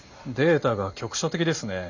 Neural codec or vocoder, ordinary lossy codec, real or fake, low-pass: none; none; real; 7.2 kHz